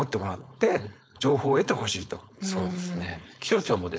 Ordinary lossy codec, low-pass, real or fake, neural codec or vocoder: none; none; fake; codec, 16 kHz, 4.8 kbps, FACodec